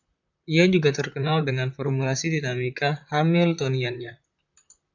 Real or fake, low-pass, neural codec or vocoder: fake; 7.2 kHz; vocoder, 44.1 kHz, 128 mel bands, Pupu-Vocoder